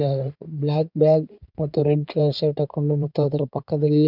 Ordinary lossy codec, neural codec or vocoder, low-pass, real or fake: none; codec, 16 kHz in and 24 kHz out, 2.2 kbps, FireRedTTS-2 codec; 5.4 kHz; fake